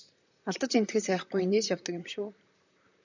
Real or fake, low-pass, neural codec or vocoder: fake; 7.2 kHz; vocoder, 44.1 kHz, 128 mel bands, Pupu-Vocoder